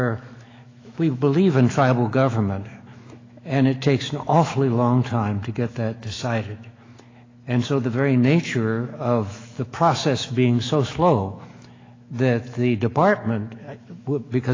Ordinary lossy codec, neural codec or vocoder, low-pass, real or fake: AAC, 32 kbps; autoencoder, 48 kHz, 128 numbers a frame, DAC-VAE, trained on Japanese speech; 7.2 kHz; fake